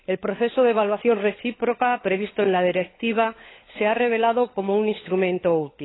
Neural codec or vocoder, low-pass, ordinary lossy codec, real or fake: codec, 16 kHz, 16 kbps, FunCodec, trained on LibriTTS, 50 frames a second; 7.2 kHz; AAC, 16 kbps; fake